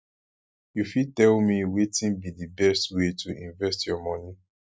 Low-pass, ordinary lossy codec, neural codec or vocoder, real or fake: none; none; none; real